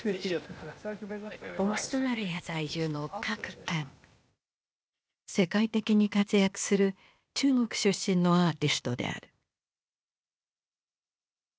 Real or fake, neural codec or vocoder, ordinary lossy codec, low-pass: fake; codec, 16 kHz, 0.8 kbps, ZipCodec; none; none